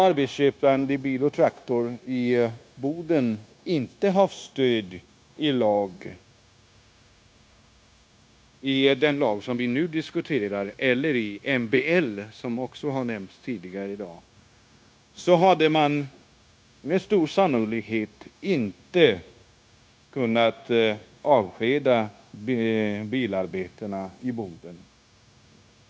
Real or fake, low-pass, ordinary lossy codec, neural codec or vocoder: fake; none; none; codec, 16 kHz, 0.9 kbps, LongCat-Audio-Codec